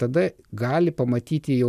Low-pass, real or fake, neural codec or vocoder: 14.4 kHz; real; none